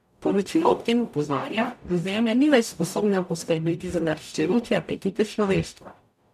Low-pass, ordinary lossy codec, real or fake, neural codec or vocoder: 14.4 kHz; none; fake; codec, 44.1 kHz, 0.9 kbps, DAC